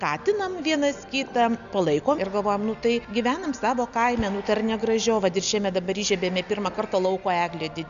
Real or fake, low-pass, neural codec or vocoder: real; 7.2 kHz; none